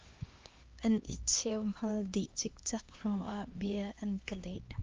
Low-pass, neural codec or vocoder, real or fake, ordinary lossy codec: 7.2 kHz; codec, 16 kHz, 2 kbps, X-Codec, HuBERT features, trained on LibriSpeech; fake; Opus, 32 kbps